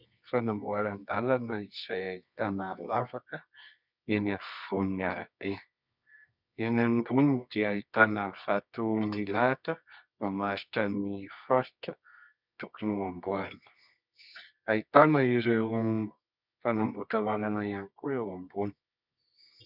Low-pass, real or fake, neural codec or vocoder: 5.4 kHz; fake; codec, 24 kHz, 0.9 kbps, WavTokenizer, medium music audio release